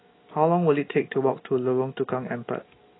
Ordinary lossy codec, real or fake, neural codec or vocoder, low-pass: AAC, 16 kbps; real; none; 7.2 kHz